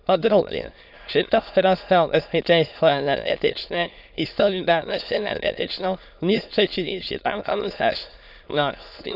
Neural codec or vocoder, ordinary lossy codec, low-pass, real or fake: autoencoder, 22.05 kHz, a latent of 192 numbers a frame, VITS, trained on many speakers; none; 5.4 kHz; fake